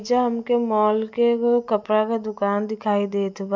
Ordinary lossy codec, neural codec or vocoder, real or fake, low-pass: MP3, 64 kbps; none; real; 7.2 kHz